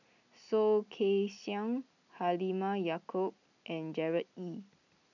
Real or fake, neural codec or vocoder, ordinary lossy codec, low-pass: real; none; none; 7.2 kHz